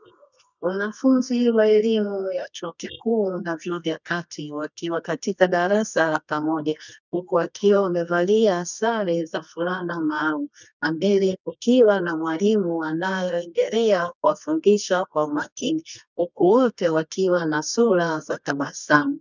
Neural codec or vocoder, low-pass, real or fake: codec, 24 kHz, 0.9 kbps, WavTokenizer, medium music audio release; 7.2 kHz; fake